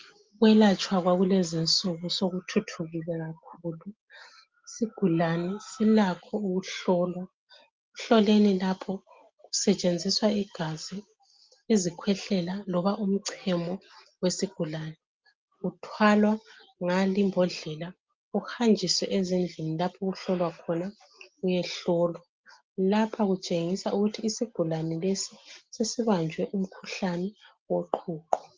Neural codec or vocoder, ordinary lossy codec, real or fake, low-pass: none; Opus, 32 kbps; real; 7.2 kHz